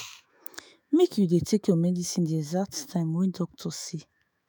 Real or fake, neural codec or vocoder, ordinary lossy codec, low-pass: fake; autoencoder, 48 kHz, 128 numbers a frame, DAC-VAE, trained on Japanese speech; none; none